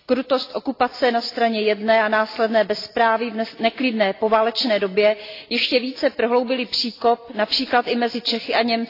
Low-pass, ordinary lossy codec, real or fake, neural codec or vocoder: 5.4 kHz; AAC, 32 kbps; real; none